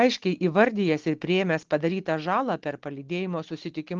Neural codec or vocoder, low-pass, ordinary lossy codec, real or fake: none; 7.2 kHz; Opus, 16 kbps; real